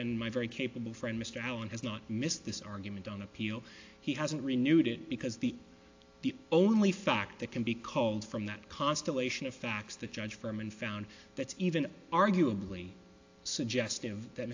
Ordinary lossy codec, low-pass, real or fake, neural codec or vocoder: AAC, 48 kbps; 7.2 kHz; real; none